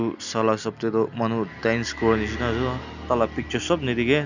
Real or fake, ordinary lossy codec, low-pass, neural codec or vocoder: real; none; 7.2 kHz; none